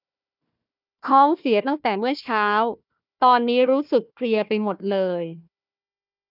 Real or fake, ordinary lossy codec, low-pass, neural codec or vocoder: fake; none; 5.4 kHz; codec, 16 kHz, 1 kbps, FunCodec, trained on Chinese and English, 50 frames a second